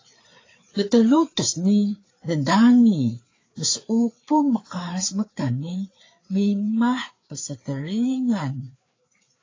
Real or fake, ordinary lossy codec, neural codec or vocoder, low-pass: fake; AAC, 32 kbps; codec, 16 kHz, 4 kbps, FreqCodec, larger model; 7.2 kHz